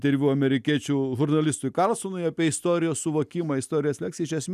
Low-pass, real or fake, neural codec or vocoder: 14.4 kHz; real; none